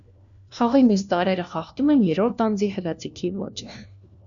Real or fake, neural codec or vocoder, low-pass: fake; codec, 16 kHz, 1 kbps, FunCodec, trained on LibriTTS, 50 frames a second; 7.2 kHz